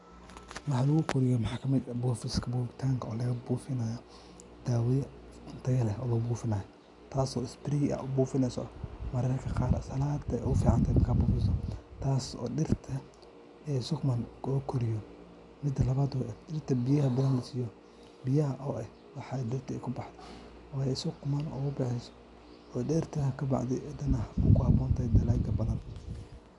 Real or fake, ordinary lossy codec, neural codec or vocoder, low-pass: real; none; none; 10.8 kHz